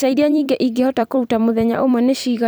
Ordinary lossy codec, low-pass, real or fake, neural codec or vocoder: none; none; fake; vocoder, 44.1 kHz, 128 mel bands every 256 samples, BigVGAN v2